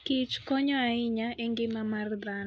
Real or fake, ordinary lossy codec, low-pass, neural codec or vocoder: real; none; none; none